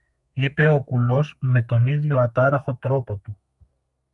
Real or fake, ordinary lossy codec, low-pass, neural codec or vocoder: fake; MP3, 64 kbps; 10.8 kHz; codec, 32 kHz, 1.9 kbps, SNAC